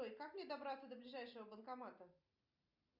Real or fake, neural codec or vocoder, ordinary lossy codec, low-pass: real; none; Opus, 64 kbps; 5.4 kHz